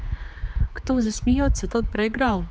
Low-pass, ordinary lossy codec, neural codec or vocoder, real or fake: none; none; codec, 16 kHz, 4 kbps, X-Codec, HuBERT features, trained on general audio; fake